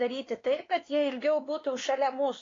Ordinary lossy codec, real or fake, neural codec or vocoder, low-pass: AAC, 32 kbps; fake; codec, 16 kHz, 2 kbps, X-Codec, WavLM features, trained on Multilingual LibriSpeech; 7.2 kHz